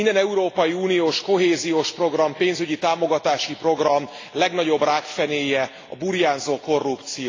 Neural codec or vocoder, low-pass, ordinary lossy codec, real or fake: none; 7.2 kHz; AAC, 32 kbps; real